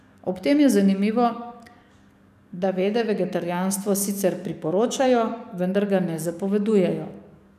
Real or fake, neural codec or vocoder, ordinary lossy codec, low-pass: fake; codec, 44.1 kHz, 7.8 kbps, DAC; none; 14.4 kHz